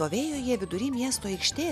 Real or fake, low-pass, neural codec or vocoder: real; 14.4 kHz; none